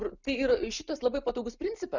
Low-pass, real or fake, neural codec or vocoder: 7.2 kHz; real; none